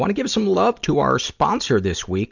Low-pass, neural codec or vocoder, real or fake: 7.2 kHz; none; real